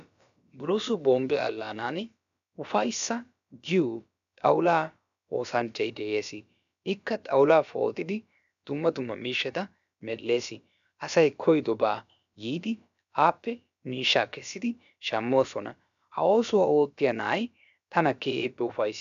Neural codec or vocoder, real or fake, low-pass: codec, 16 kHz, about 1 kbps, DyCAST, with the encoder's durations; fake; 7.2 kHz